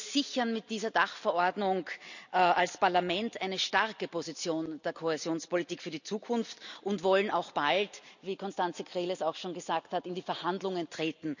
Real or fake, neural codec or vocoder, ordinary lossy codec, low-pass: real; none; none; 7.2 kHz